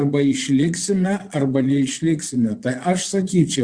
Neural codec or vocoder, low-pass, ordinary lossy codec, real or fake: vocoder, 22.05 kHz, 80 mel bands, Vocos; 9.9 kHz; Opus, 64 kbps; fake